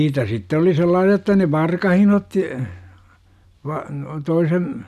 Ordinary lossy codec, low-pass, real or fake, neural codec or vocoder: none; 14.4 kHz; real; none